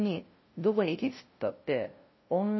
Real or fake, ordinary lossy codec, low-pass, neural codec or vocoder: fake; MP3, 24 kbps; 7.2 kHz; codec, 16 kHz, 0.5 kbps, FunCodec, trained on LibriTTS, 25 frames a second